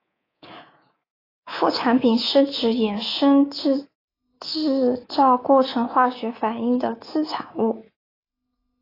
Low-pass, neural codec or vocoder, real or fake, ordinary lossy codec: 5.4 kHz; codec, 24 kHz, 3.1 kbps, DualCodec; fake; AAC, 24 kbps